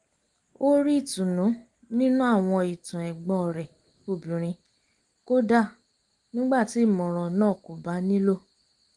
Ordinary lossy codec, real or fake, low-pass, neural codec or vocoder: Opus, 24 kbps; real; 10.8 kHz; none